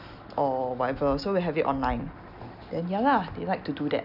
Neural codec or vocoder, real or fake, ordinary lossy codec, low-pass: none; real; none; 5.4 kHz